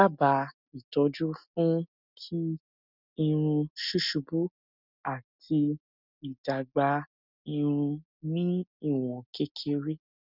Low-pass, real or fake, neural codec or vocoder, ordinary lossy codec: 5.4 kHz; real; none; none